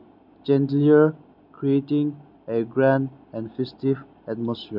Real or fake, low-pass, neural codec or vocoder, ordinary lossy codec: real; 5.4 kHz; none; none